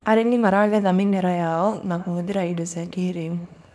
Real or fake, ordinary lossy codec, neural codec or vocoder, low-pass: fake; none; codec, 24 kHz, 0.9 kbps, WavTokenizer, small release; none